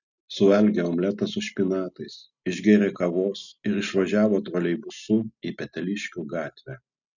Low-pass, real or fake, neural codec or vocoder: 7.2 kHz; real; none